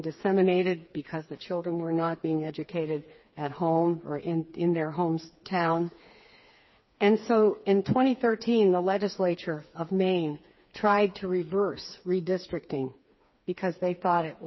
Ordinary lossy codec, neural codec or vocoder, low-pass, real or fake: MP3, 24 kbps; codec, 16 kHz, 4 kbps, FreqCodec, smaller model; 7.2 kHz; fake